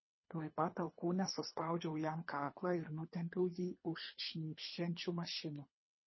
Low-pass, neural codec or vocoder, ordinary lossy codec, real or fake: 7.2 kHz; codec, 24 kHz, 3 kbps, HILCodec; MP3, 24 kbps; fake